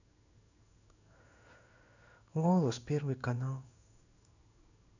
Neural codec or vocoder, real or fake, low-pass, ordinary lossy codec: codec, 16 kHz in and 24 kHz out, 1 kbps, XY-Tokenizer; fake; 7.2 kHz; none